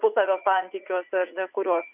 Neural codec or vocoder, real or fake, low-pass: vocoder, 44.1 kHz, 80 mel bands, Vocos; fake; 3.6 kHz